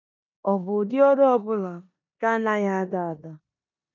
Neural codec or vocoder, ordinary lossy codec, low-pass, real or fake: codec, 16 kHz in and 24 kHz out, 0.9 kbps, LongCat-Audio-Codec, four codebook decoder; none; 7.2 kHz; fake